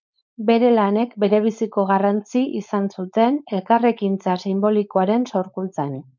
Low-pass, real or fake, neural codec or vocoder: 7.2 kHz; fake; codec, 16 kHz, 4.8 kbps, FACodec